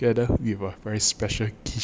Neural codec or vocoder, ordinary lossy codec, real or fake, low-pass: none; none; real; none